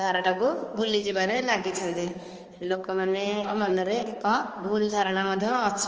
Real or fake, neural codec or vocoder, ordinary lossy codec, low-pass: fake; codec, 16 kHz, 2 kbps, X-Codec, HuBERT features, trained on balanced general audio; Opus, 24 kbps; 7.2 kHz